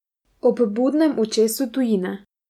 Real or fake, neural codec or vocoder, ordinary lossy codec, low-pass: real; none; MP3, 96 kbps; 19.8 kHz